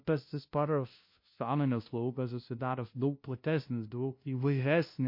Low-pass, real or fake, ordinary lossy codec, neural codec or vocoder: 5.4 kHz; fake; MP3, 32 kbps; codec, 16 kHz, 0.5 kbps, FunCodec, trained on LibriTTS, 25 frames a second